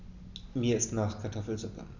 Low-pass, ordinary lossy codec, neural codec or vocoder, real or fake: 7.2 kHz; none; none; real